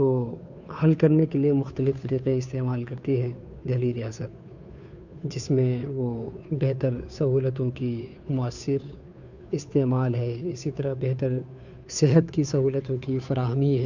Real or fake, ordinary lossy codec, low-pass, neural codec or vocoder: fake; none; 7.2 kHz; codec, 16 kHz, 2 kbps, FunCodec, trained on Chinese and English, 25 frames a second